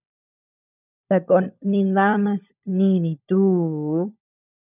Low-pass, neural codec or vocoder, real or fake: 3.6 kHz; codec, 16 kHz, 16 kbps, FunCodec, trained on LibriTTS, 50 frames a second; fake